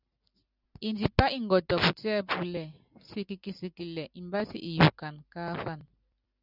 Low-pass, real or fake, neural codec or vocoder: 5.4 kHz; real; none